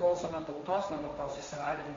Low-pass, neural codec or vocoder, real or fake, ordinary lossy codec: 7.2 kHz; codec, 16 kHz, 1.1 kbps, Voila-Tokenizer; fake; MP3, 48 kbps